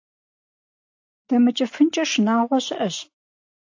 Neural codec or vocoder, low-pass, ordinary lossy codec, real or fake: none; 7.2 kHz; AAC, 48 kbps; real